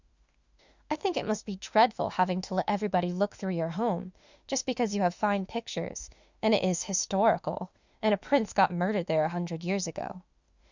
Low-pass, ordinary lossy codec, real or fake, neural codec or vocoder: 7.2 kHz; Opus, 64 kbps; fake; autoencoder, 48 kHz, 32 numbers a frame, DAC-VAE, trained on Japanese speech